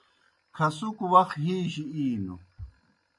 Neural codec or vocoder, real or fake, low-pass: none; real; 10.8 kHz